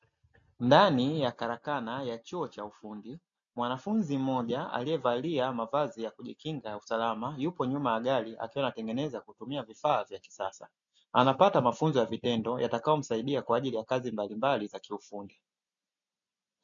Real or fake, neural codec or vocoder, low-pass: real; none; 7.2 kHz